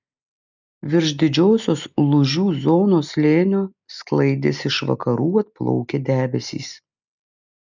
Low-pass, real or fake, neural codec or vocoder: 7.2 kHz; real; none